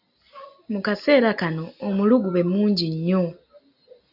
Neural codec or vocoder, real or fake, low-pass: none; real; 5.4 kHz